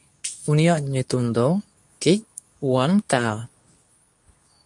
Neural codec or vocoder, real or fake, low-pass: codec, 24 kHz, 0.9 kbps, WavTokenizer, medium speech release version 2; fake; 10.8 kHz